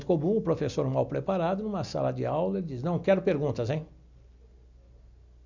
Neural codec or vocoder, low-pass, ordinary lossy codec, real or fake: none; 7.2 kHz; none; real